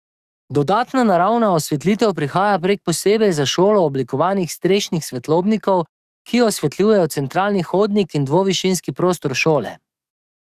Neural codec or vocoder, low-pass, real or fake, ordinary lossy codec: codec, 44.1 kHz, 7.8 kbps, DAC; 14.4 kHz; fake; Opus, 64 kbps